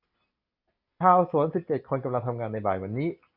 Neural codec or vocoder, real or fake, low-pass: none; real; 5.4 kHz